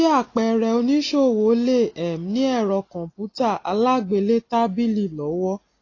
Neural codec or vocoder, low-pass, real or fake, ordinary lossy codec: none; 7.2 kHz; real; AAC, 32 kbps